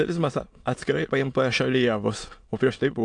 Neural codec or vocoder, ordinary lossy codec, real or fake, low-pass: autoencoder, 22.05 kHz, a latent of 192 numbers a frame, VITS, trained on many speakers; AAC, 64 kbps; fake; 9.9 kHz